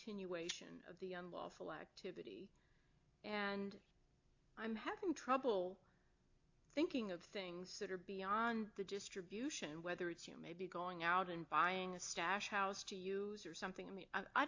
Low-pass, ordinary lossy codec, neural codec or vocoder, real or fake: 7.2 kHz; AAC, 48 kbps; none; real